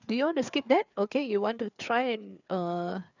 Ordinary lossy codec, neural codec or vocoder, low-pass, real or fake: none; codec, 16 kHz, 4 kbps, FreqCodec, larger model; 7.2 kHz; fake